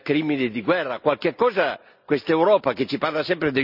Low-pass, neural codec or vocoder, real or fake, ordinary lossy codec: 5.4 kHz; none; real; none